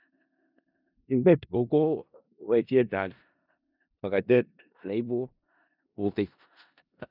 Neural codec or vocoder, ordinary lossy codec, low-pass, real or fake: codec, 16 kHz in and 24 kHz out, 0.4 kbps, LongCat-Audio-Codec, four codebook decoder; none; 5.4 kHz; fake